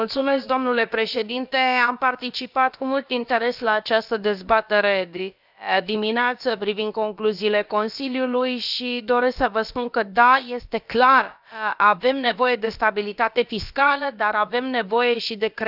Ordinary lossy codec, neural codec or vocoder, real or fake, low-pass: none; codec, 16 kHz, about 1 kbps, DyCAST, with the encoder's durations; fake; 5.4 kHz